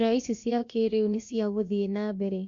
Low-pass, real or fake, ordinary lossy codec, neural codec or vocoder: 7.2 kHz; fake; MP3, 48 kbps; codec, 16 kHz, about 1 kbps, DyCAST, with the encoder's durations